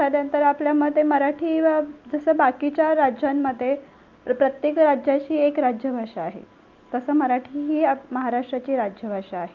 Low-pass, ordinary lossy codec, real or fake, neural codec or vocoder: 7.2 kHz; Opus, 32 kbps; real; none